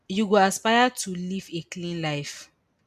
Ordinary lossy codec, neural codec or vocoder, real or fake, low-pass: none; none; real; 14.4 kHz